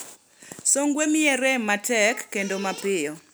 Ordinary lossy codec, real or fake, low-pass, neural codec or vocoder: none; real; none; none